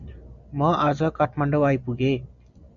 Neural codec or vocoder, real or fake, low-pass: none; real; 7.2 kHz